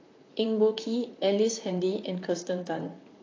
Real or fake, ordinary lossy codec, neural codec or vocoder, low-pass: fake; AAC, 32 kbps; vocoder, 44.1 kHz, 128 mel bands, Pupu-Vocoder; 7.2 kHz